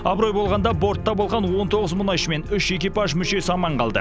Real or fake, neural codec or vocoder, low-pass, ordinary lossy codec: real; none; none; none